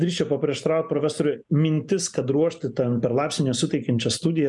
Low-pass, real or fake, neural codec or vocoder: 10.8 kHz; real; none